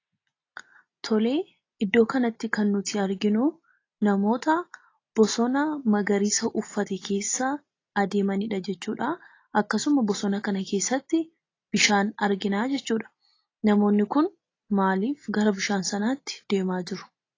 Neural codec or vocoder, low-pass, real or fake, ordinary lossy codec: none; 7.2 kHz; real; AAC, 32 kbps